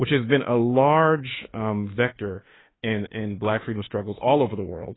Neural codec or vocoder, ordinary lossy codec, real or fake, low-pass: autoencoder, 48 kHz, 32 numbers a frame, DAC-VAE, trained on Japanese speech; AAC, 16 kbps; fake; 7.2 kHz